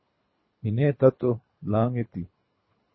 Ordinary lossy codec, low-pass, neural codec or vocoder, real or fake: MP3, 24 kbps; 7.2 kHz; codec, 24 kHz, 3 kbps, HILCodec; fake